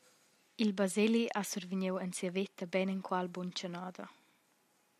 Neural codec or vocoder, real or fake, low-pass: none; real; 14.4 kHz